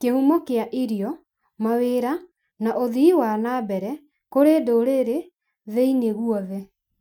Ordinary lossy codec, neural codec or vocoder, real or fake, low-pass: none; none; real; 19.8 kHz